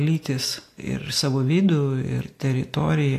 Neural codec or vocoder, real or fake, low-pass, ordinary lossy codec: none; real; 14.4 kHz; AAC, 64 kbps